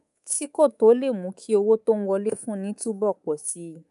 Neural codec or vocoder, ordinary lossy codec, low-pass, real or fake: codec, 24 kHz, 3.1 kbps, DualCodec; none; 10.8 kHz; fake